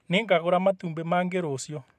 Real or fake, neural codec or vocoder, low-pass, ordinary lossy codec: fake; vocoder, 44.1 kHz, 128 mel bands every 512 samples, BigVGAN v2; 14.4 kHz; none